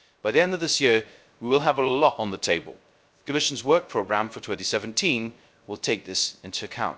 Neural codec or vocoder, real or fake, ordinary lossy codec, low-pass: codec, 16 kHz, 0.3 kbps, FocalCodec; fake; none; none